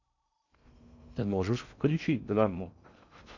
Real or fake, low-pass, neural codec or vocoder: fake; 7.2 kHz; codec, 16 kHz in and 24 kHz out, 0.6 kbps, FocalCodec, streaming, 2048 codes